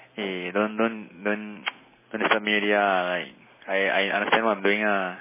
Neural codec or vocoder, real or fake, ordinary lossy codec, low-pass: none; real; MP3, 16 kbps; 3.6 kHz